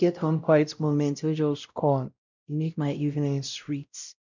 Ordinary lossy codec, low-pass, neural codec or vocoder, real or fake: none; 7.2 kHz; codec, 16 kHz, 0.5 kbps, X-Codec, WavLM features, trained on Multilingual LibriSpeech; fake